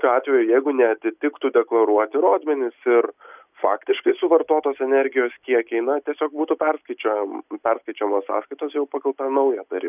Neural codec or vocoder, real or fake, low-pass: none; real; 3.6 kHz